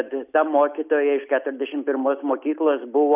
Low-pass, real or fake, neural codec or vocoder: 3.6 kHz; real; none